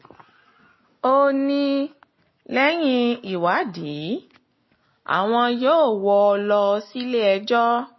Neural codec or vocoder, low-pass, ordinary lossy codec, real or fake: none; 7.2 kHz; MP3, 24 kbps; real